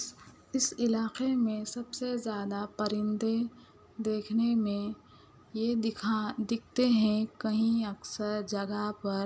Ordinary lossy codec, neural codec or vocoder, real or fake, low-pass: none; none; real; none